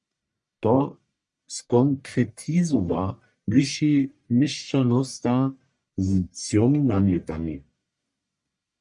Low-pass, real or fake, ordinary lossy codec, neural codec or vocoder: 10.8 kHz; fake; MP3, 96 kbps; codec, 44.1 kHz, 1.7 kbps, Pupu-Codec